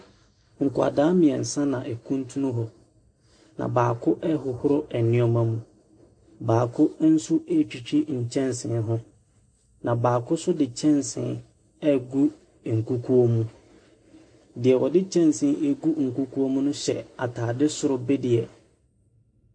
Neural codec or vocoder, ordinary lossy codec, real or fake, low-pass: none; MP3, 48 kbps; real; 9.9 kHz